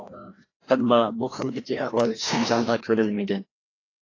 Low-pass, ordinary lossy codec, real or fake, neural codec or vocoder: 7.2 kHz; AAC, 32 kbps; fake; codec, 16 kHz, 1 kbps, FreqCodec, larger model